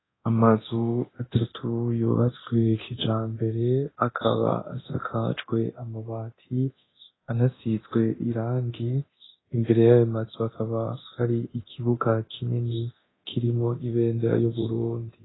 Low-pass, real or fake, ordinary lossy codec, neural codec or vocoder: 7.2 kHz; fake; AAC, 16 kbps; codec, 24 kHz, 0.9 kbps, DualCodec